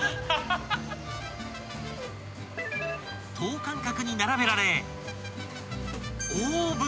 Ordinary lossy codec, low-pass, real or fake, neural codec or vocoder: none; none; real; none